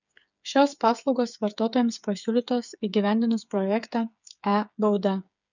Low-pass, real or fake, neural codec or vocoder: 7.2 kHz; fake; codec, 16 kHz, 8 kbps, FreqCodec, smaller model